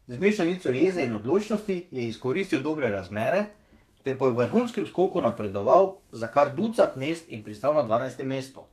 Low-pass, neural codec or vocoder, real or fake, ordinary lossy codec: 14.4 kHz; codec, 32 kHz, 1.9 kbps, SNAC; fake; none